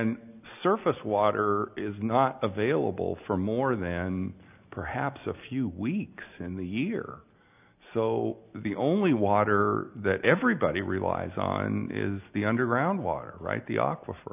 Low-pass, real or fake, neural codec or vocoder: 3.6 kHz; real; none